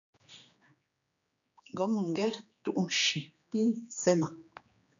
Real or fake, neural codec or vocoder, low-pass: fake; codec, 16 kHz, 2 kbps, X-Codec, HuBERT features, trained on general audio; 7.2 kHz